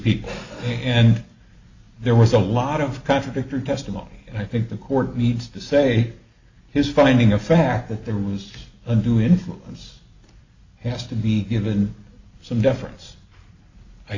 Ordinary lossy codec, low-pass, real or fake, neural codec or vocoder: AAC, 48 kbps; 7.2 kHz; real; none